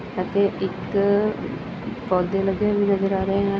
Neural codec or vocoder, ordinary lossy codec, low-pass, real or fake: none; none; none; real